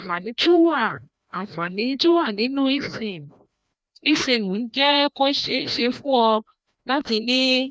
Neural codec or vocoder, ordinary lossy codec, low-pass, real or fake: codec, 16 kHz, 1 kbps, FreqCodec, larger model; none; none; fake